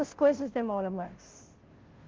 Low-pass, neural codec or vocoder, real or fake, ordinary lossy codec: 7.2 kHz; codec, 16 kHz, 0.5 kbps, FunCodec, trained on Chinese and English, 25 frames a second; fake; Opus, 16 kbps